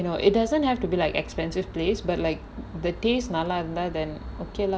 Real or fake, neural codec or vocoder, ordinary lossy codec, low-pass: real; none; none; none